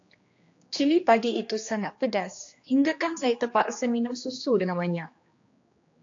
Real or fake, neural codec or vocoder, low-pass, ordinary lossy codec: fake; codec, 16 kHz, 2 kbps, X-Codec, HuBERT features, trained on general audio; 7.2 kHz; AAC, 48 kbps